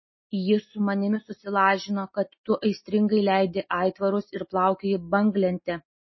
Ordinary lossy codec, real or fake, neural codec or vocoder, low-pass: MP3, 24 kbps; real; none; 7.2 kHz